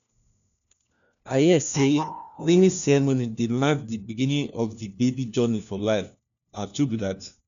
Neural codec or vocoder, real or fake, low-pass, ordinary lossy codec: codec, 16 kHz, 1 kbps, FunCodec, trained on LibriTTS, 50 frames a second; fake; 7.2 kHz; none